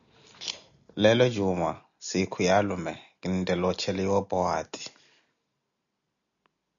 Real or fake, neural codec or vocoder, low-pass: real; none; 7.2 kHz